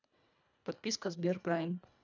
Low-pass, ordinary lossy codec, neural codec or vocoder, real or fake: 7.2 kHz; none; codec, 24 kHz, 1.5 kbps, HILCodec; fake